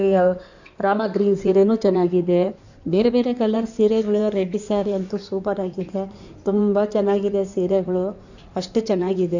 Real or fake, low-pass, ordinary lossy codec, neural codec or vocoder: fake; 7.2 kHz; none; codec, 16 kHz in and 24 kHz out, 2.2 kbps, FireRedTTS-2 codec